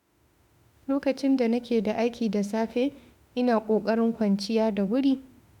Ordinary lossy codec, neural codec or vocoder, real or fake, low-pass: none; autoencoder, 48 kHz, 32 numbers a frame, DAC-VAE, trained on Japanese speech; fake; 19.8 kHz